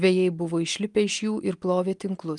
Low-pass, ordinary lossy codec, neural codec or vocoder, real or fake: 10.8 kHz; Opus, 32 kbps; none; real